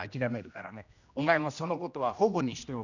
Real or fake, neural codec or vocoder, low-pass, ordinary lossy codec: fake; codec, 16 kHz, 1 kbps, X-Codec, HuBERT features, trained on general audio; 7.2 kHz; none